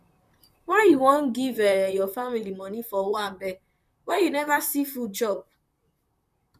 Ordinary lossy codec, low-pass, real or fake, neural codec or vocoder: none; 14.4 kHz; fake; vocoder, 44.1 kHz, 128 mel bands, Pupu-Vocoder